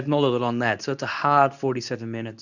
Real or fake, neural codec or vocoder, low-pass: fake; codec, 24 kHz, 0.9 kbps, WavTokenizer, medium speech release version 2; 7.2 kHz